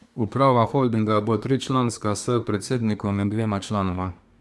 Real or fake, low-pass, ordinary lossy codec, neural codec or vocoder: fake; none; none; codec, 24 kHz, 1 kbps, SNAC